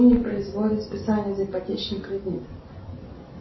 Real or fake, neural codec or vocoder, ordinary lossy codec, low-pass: real; none; MP3, 24 kbps; 7.2 kHz